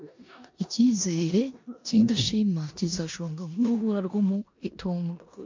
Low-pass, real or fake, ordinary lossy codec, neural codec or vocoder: 7.2 kHz; fake; MP3, 64 kbps; codec, 16 kHz in and 24 kHz out, 0.9 kbps, LongCat-Audio-Codec, four codebook decoder